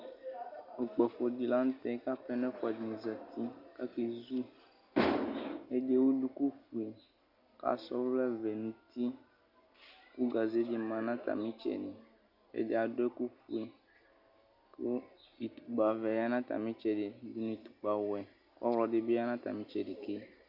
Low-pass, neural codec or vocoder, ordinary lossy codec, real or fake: 5.4 kHz; none; Opus, 64 kbps; real